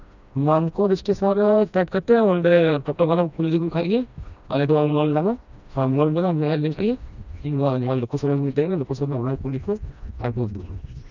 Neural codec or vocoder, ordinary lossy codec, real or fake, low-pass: codec, 16 kHz, 1 kbps, FreqCodec, smaller model; none; fake; 7.2 kHz